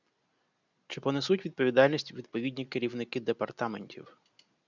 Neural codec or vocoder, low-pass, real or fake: none; 7.2 kHz; real